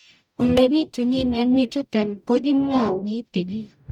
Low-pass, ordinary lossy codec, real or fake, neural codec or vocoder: 19.8 kHz; none; fake; codec, 44.1 kHz, 0.9 kbps, DAC